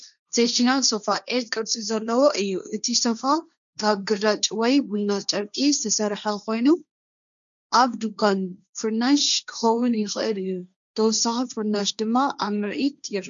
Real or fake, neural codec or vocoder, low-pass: fake; codec, 16 kHz, 1.1 kbps, Voila-Tokenizer; 7.2 kHz